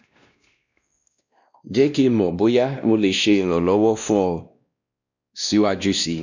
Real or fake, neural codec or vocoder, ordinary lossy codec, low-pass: fake; codec, 16 kHz, 1 kbps, X-Codec, WavLM features, trained on Multilingual LibriSpeech; none; 7.2 kHz